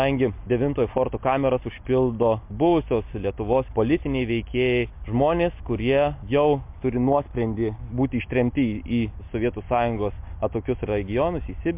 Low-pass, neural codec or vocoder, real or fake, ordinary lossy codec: 3.6 kHz; none; real; MP3, 32 kbps